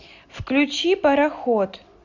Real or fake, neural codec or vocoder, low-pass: real; none; 7.2 kHz